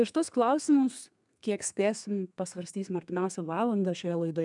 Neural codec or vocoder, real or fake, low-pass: codec, 32 kHz, 1.9 kbps, SNAC; fake; 10.8 kHz